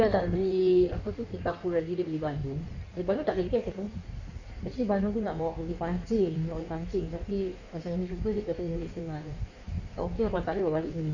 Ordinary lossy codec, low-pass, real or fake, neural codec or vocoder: none; 7.2 kHz; fake; codec, 16 kHz in and 24 kHz out, 1.1 kbps, FireRedTTS-2 codec